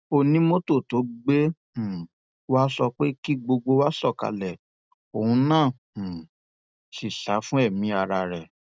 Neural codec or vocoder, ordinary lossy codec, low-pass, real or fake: none; none; none; real